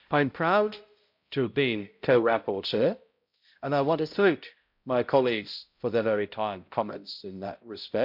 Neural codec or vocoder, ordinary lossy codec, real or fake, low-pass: codec, 16 kHz, 0.5 kbps, X-Codec, HuBERT features, trained on balanced general audio; MP3, 48 kbps; fake; 5.4 kHz